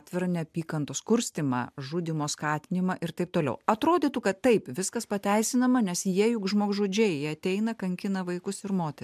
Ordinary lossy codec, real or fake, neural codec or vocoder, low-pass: MP3, 96 kbps; real; none; 14.4 kHz